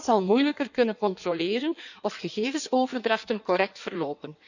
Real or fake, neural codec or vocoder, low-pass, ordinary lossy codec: fake; codec, 16 kHz in and 24 kHz out, 1.1 kbps, FireRedTTS-2 codec; 7.2 kHz; none